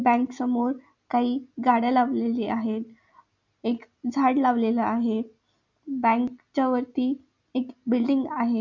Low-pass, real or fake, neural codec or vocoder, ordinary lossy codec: 7.2 kHz; real; none; none